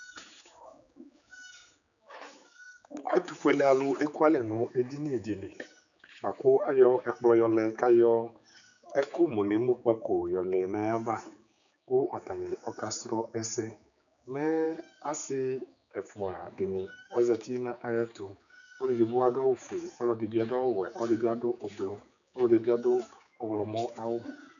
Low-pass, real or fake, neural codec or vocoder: 7.2 kHz; fake; codec, 16 kHz, 4 kbps, X-Codec, HuBERT features, trained on general audio